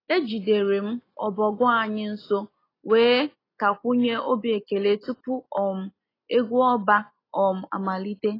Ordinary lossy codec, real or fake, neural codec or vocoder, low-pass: AAC, 24 kbps; real; none; 5.4 kHz